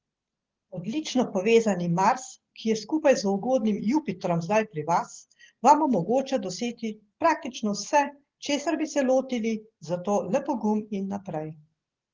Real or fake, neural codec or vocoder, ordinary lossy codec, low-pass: real; none; Opus, 16 kbps; 7.2 kHz